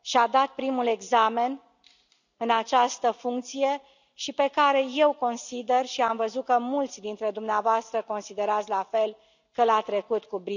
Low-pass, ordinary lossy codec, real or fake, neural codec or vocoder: 7.2 kHz; none; real; none